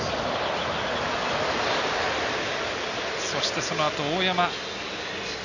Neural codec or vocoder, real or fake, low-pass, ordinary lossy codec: none; real; 7.2 kHz; none